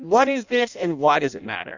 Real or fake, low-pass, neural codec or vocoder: fake; 7.2 kHz; codec, 16 kHz in and 24 kHz out, 0.6 kbps, FireRedTTS-2 codec